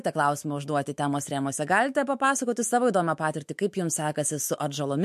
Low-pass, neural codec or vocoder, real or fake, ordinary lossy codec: 14.4 kHz; autoencoder, 48 kHz, 128 numbers a frame, DAC-VAE, trained on Japanese speech; fake; MP3, 64 kbps